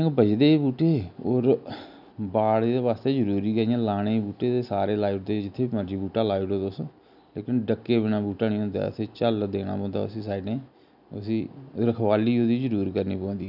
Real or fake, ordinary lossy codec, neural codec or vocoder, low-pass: real; none; none; 5.4 kHz